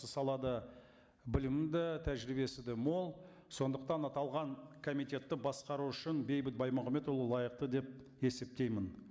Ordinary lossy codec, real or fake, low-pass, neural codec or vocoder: none; real; none; none